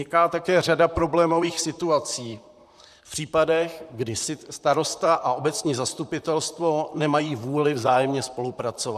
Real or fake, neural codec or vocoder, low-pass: fake; vocoder, 44.1 kHz, 128 mel bands, Pupu-Vocoder; 14.4 kHz